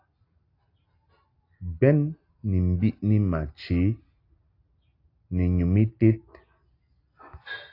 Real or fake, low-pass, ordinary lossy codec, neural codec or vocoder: real; 5.4 kHz; AAC, 32 kbps; none